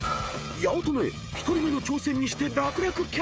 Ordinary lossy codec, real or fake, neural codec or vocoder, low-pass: none; fake; codec, 16 kHz, 16 kbps, FreqCodec, smaller model; none